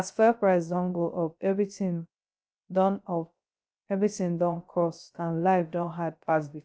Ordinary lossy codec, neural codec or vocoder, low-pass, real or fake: none; codec, 16 kHz, 0.3 kbps, FocalCodec; none; fake